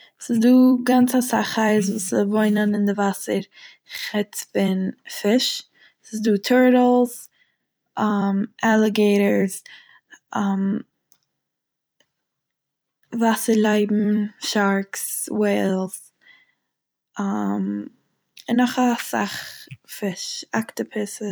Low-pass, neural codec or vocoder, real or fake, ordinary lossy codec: none; vocoder, 44.1 kHz, 128 mel bands every 256 samples, BigVGAN v2; fake; none